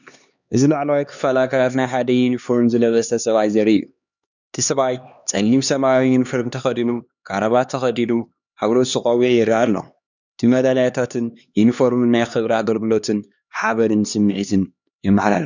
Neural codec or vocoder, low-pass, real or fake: codec, 16 kHz, 2 kbps, X-Codec, HuBERT features, trained on LibriSpeech; 7.2 kHz; fake